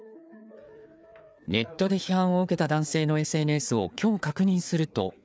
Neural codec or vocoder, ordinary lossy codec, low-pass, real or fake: codec, 16 kHz, 4 kbps, FreqCodec, larger model; none; none; fake